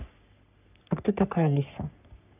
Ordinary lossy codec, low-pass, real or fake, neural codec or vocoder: none; 3.6 kHz; fake; codec, 44.1 kHz, 3.4 kbps, Pupu-Codec